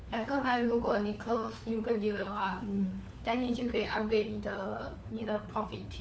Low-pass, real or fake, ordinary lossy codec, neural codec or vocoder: none; fake; none; codec, 16 kHz, 4 kbps, FunCodec, trained on LibriTTS, 50 frames a second